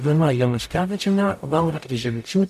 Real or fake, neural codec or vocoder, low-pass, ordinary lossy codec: fake; codec, 44.1 kHz, 0.9 kbps, DAC; 14.4 kHz; MP3, 96 kbps